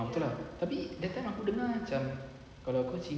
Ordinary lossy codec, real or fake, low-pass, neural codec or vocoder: none; real; none; none